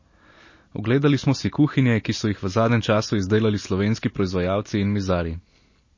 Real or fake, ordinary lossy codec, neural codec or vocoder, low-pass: real; MP3, 32 kbps; none; 7.2 kHz